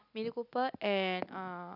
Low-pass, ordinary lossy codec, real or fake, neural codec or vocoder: 5.4 kHz; none; real; none